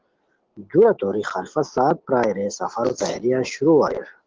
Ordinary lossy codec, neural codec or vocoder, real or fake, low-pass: Opus, 16 kbps; none; real; 7.2 kHz